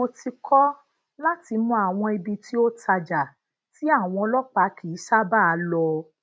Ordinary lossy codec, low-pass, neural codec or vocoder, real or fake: none; none; none; real